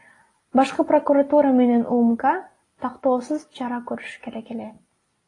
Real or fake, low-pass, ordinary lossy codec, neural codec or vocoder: real; 10.8 kHz; AAC, 32 kbps; none